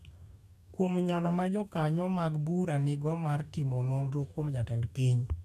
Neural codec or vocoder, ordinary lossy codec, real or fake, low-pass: codec, 44.1 kHz, 2.6 kbps, DAC; none; fake; 14.4 kHz